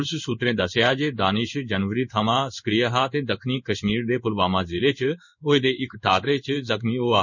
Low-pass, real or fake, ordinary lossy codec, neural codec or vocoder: 7.2 kHz; fake; none; codec, 16 kHz in and 24 kHz out, 1 kbps, XY-Tokenizer